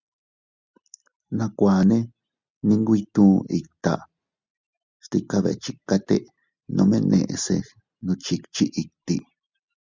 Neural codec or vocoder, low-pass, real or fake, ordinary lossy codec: none; 7.2 kHz; real; Opus, 64 kbps